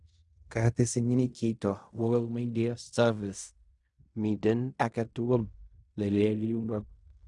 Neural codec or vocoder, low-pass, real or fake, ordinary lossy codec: codec, 16 kHz in and 24 kHz out, 0.4 kbps, LongCat-Audio-Codec, fine tuned four codebook decoder; 10.8 kHz; fake; none